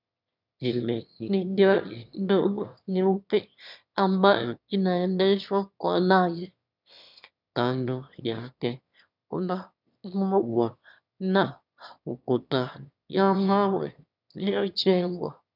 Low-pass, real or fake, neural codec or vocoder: 5.4 kHz; fake; autoencoder, 22.05 kHz, a latent of 192 numbers a frame, VITS, trained on one speaker